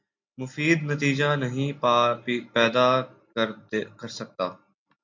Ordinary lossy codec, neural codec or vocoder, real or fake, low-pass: AAC, 48 kbps; none; real; 7.2 kHz